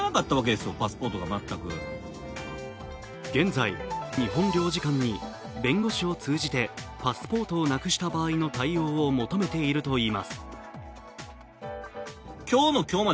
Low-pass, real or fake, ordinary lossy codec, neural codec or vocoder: none; real; none; none